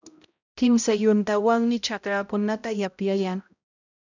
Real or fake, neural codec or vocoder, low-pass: fake; codec, 16 kHz, 0.5 kbps, X-Codec, HuBERT features, trained on balanced general audio; 7.2 kHz